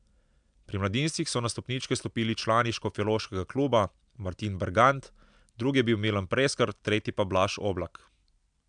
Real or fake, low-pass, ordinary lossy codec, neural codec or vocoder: real; 9.9 kHz; MP3, 96 kbps; none